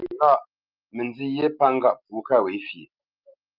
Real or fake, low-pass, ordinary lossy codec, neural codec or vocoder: real; 5.4 kHz; Opus, 24 kbps; none